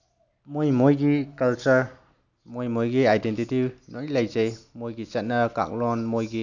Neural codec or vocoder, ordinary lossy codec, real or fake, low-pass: none; none; real; 7.2 kHz